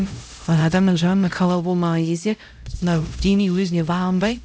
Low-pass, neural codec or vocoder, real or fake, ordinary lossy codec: none; codec, 16 kHz, 0.5 kbps, X-Codec, HuBERT features, trained on LibriSpeech; fake; none